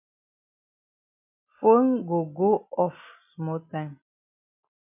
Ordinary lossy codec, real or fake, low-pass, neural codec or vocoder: MP3, 32 kbps; real; 3.6 kHz; none